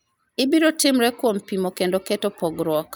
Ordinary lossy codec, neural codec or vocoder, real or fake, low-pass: none; none; real; none